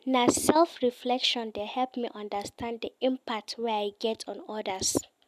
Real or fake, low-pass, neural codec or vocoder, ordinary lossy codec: real; 14.4 kHz; none; none